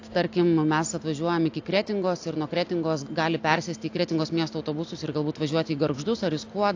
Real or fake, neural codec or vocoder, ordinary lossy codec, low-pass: real; none; AAC, 48 kbps; 7.2 kHz